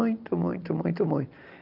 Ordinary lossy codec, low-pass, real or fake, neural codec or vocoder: Opus, 24 kbps; 5.4 kHz; real; none